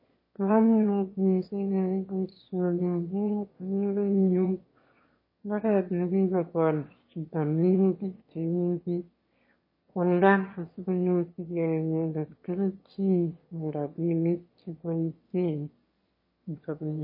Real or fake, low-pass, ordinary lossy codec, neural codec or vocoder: fake; 5.4 kHz; MP3, 24 kbps; autoencoder, 22.05 kHz, a latent of 192 numbers a frame, VITS, trained on one speaker